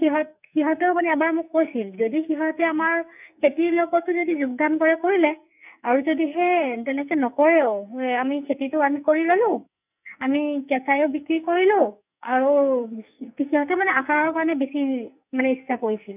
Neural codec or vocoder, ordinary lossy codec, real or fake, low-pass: codec, 44.1 kHz, 2.6 kbps, SNAC; none; fake; 3.6 kHz